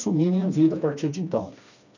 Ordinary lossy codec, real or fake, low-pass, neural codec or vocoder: none; fake; 7.2 kHz; codec, 16 kHz, 2 kbps, FreqCodec, smaller model